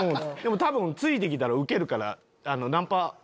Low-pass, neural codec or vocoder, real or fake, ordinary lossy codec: none; none; real; none